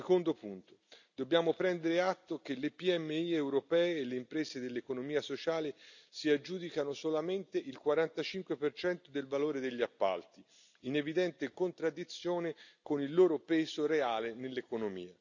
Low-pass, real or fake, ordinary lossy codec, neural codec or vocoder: 7.2 kHz; real; none; none